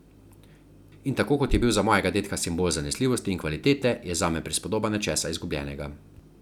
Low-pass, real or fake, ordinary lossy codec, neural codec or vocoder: 19.8 kHz; real; none; none